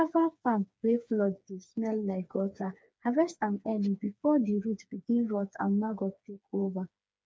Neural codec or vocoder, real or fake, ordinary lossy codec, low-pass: codec, 16 kHz, 4 kbps, FreqCodec, smaller model; fake; none; none